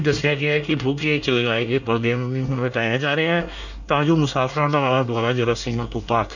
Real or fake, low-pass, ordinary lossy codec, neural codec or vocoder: fake; 7.2 kHz; none; codec, 24 kHz, 1 kbps, SNAC